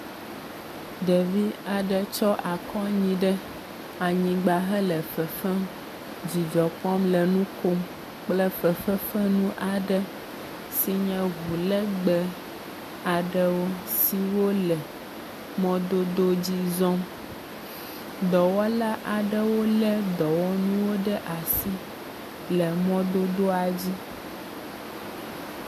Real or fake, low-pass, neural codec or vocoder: real; 14.4 kHz; none